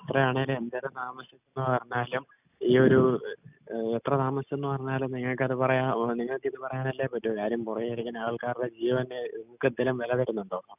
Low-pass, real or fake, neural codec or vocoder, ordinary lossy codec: 3.6 kHz; real; none; none